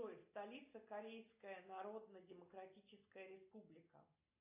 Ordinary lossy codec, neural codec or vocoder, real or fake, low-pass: AAC, 32 kbps; none; real; 3.6 kHz